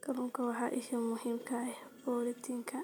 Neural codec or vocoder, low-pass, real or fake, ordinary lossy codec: none; none; real; none